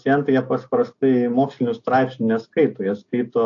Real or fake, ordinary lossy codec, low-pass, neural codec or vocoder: real; AAC, 48 kbps; 7.2 kHz; none